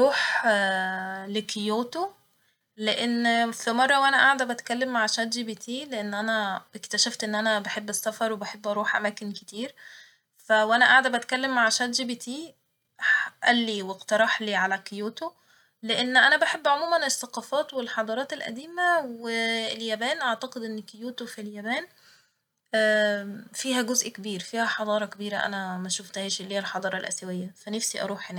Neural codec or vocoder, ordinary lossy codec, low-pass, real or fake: none; none; 19.8 kHz; real